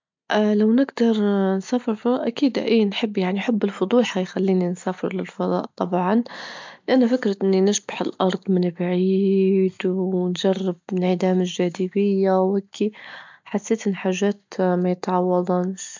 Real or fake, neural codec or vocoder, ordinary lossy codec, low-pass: real; none; MP3, 64 kbps; 7.2 kHz